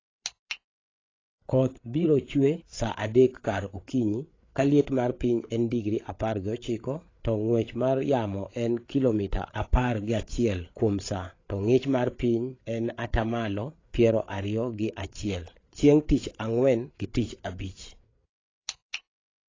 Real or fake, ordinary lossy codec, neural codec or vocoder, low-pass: fake; AAC, 32 kbps; codec, 16 kHz, 8 kbps, FreqCodec, larger model; 7.2 kHz